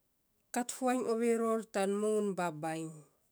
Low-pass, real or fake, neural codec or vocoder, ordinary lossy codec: none; fake; autoencoder, 48 kHz, 128 numbers a frame, DAC-VAE, trained on Japanese speech; none